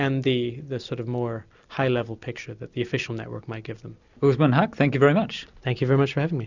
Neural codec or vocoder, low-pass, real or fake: none; 7.2 kHz; real